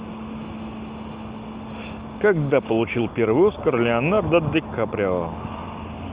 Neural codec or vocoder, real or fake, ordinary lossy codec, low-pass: none; real; Opus, 32 kbps; 3.6 kHz